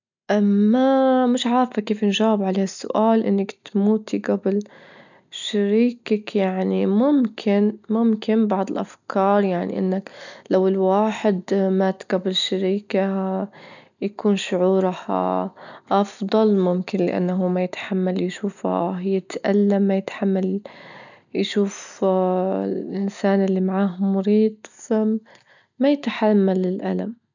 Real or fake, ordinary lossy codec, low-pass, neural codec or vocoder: real; none; 7.2 kHz; none